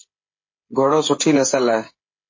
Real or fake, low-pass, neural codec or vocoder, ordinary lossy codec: fake; 7.2 kHz; codec, 16 kHz, 8 kbps, FreqCodec, smaller model; MP3, 32 kbps